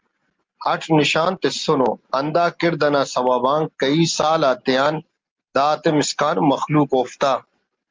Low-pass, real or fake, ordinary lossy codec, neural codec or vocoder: 7.2 kHz; real; Opus, 32 kbps; none